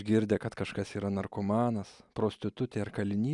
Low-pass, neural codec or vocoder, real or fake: 10.8 kHz; none; real